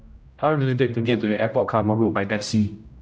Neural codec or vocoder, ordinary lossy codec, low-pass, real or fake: codec, 16 kHz, 0.5 kbps, X-Codec, HuBERT features, trained on general audio; none; none; fake